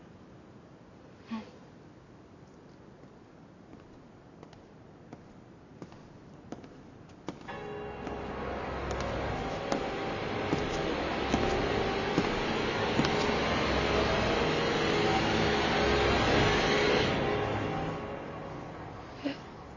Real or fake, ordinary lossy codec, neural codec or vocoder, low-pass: real; none; none; 7.2 kHz